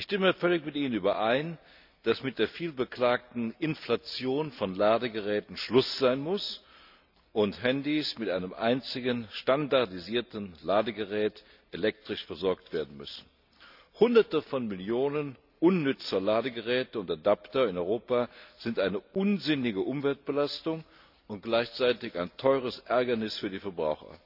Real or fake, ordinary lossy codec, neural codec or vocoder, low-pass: real; none; none; 5.4 kHz